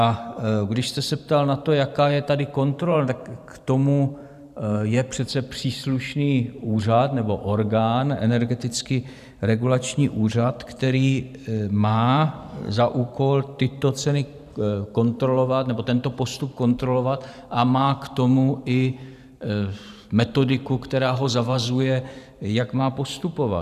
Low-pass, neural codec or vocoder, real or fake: 14.4 kHz; vocoder, 44.1 kHz, 128 mel bands every 512 samples, BigVGAN v2; fake